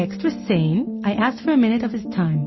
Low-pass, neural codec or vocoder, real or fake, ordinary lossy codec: 7.2 kHz; none; real; MP3, 24 kbps